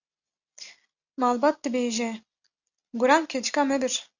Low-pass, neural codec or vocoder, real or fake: 7.2 kHz; none; real